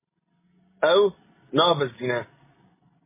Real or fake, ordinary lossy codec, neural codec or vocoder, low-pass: real; MP3, 16 kbps; none; 3.6 kHz